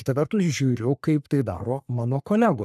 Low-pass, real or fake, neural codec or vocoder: 14.4 kHz; fake; codec, 44.1 kHz, 3.4 kbps, Pupu-Codec